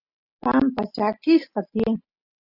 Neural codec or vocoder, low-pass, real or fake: none; 5.4 kHz; real